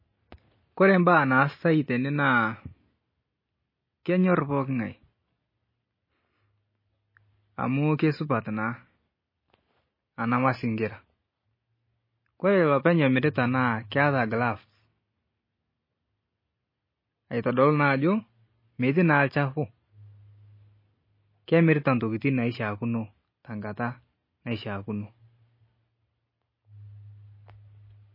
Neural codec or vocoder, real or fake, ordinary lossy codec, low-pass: none; real; MP3, 24 kbps; 5.4 kHz